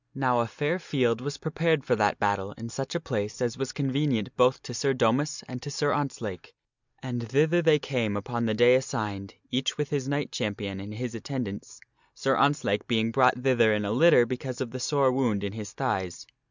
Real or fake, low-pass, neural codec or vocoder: real; 7.2 kHz; none